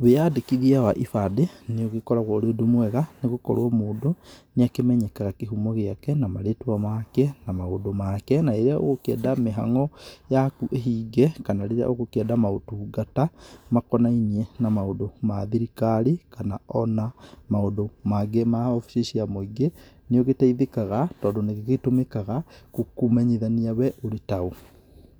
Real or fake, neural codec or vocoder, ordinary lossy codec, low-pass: real; none; none; none